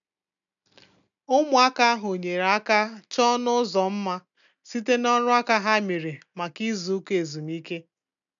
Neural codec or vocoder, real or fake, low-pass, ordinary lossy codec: none; real; 7.2 kHz; none